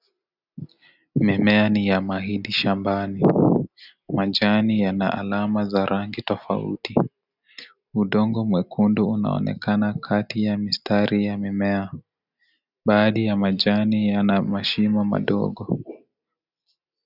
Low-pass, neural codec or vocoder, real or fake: 5.4 kHz; none; real